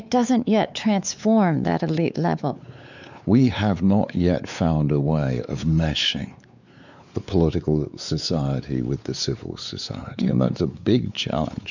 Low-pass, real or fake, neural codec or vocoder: 7.2 kHz; fake; codec, 16 kHz, 4 kbps, X-Codec, WavLM features, trained on Multilingual LibriSpeech